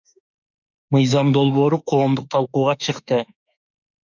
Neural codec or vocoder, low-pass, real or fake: autoencoder, 48 kHz, 32 numbers a frame, DAC-VAE, trained on Japanese speech; 7.2 kHz; fake